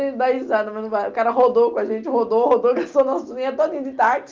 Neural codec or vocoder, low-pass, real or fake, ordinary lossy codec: none; 7.2 kHz; real; Opus, 32 kbps